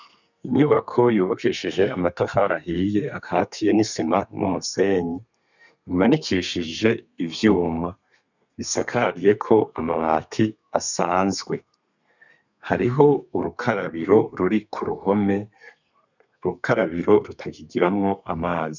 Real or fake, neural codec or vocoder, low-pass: fake; codec, 32 kHz, 1.9 kbps, SNAC; 7.2 kHz